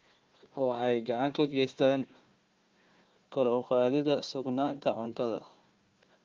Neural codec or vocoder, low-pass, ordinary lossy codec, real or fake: codec, 16 kHz, 1 kbps, FunCodec, trained on Chinese and English, 50 frames a second; 7.2 kHz; Opus, 32 kbps; fake